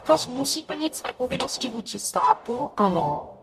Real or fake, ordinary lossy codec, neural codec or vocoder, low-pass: fake; MP3, 96 kbps; codec, 44.1 kHz, 0.9 kbps, DAC; 14.4 kHz